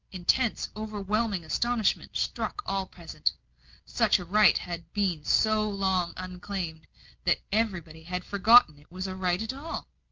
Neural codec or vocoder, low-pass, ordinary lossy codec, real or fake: none; 7.2 kHz; Opus, 32 kbps; real